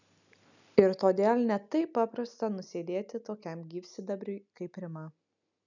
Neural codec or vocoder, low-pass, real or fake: none; 7.2 kHz; real